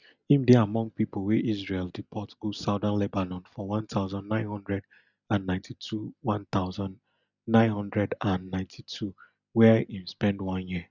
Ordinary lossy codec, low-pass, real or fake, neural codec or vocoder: none; 7.2 kHz; real; none